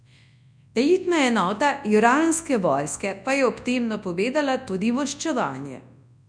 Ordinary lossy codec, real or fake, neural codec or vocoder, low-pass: none; fake; codec, 24 kHz, 0.9 kbps, WavTokenizer, large speech release; 9.9 kHz